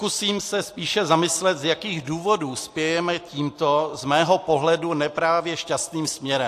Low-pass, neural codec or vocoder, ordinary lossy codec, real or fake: 14.4 kHz; none; AAC, 64 kbps; real